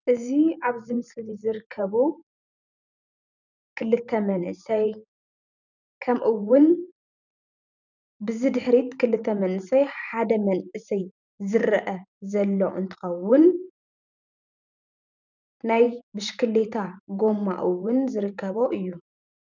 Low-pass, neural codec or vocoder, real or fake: 7.2 kHz; vocoder, 44.1 kHz, 128 mel bands every 512 samples, BigVGAN v2; fake